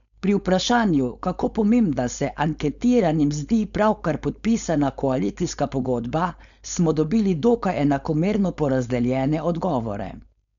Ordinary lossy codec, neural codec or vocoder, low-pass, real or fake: none; codec, 16 kHz, 4.8 kbps, FACodec; 7.2 kHz; fake